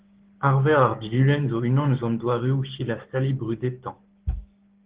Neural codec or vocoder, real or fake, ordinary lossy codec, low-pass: codec, 16 kHz, 6 kbps, DAC; fake; Opus, 16 kbps; 3.6 kHz